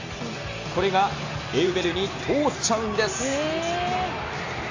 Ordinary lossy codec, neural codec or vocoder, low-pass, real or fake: AAC, 48 kbps; none; 7.2 kHz; real